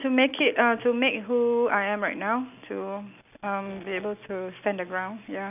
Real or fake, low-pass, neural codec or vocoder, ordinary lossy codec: real; 3.6 kHz; none; none